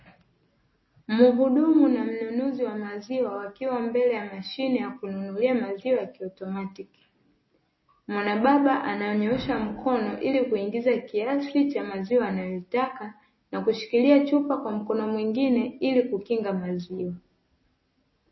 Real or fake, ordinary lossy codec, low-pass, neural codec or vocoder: real; MP3, 24 kbps; 7.2 kHz; none